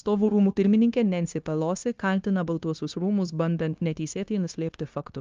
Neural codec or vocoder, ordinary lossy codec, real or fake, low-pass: codec, 16 kHz, 0.9 kbps, LongCat-Audio-Codec; Opus, 32 kbps; fake; 7.2 kHz